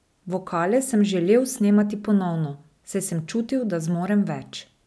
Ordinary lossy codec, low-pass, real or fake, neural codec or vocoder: none; none; real; none